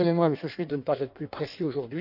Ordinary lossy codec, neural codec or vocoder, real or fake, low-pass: none; codec, 16 kHz in and 24 kHz out, 1.1 kbps, FireRedTTS-2 codec; fake; 5.4 kHz